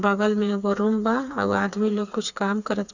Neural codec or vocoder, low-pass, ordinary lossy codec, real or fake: codec, 16 kHz, 4 kbps, FreqCodec, smaller model; 7.2 kHz; none; fake